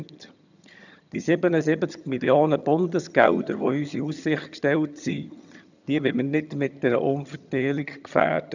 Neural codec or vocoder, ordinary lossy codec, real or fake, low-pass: vocoder, 22.05 kHz, 80 mel bands, HiFi-GAN; none; fake; 7.2 kHz